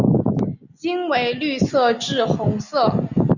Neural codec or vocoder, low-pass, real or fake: none; 7.2 kHz; real